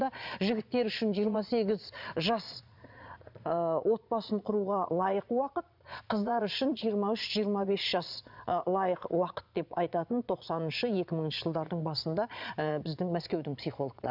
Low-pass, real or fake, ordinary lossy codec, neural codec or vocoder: 5.4 kHz; fake; none; vocoder, 22.05 kHz, 80 mel bands, Vocos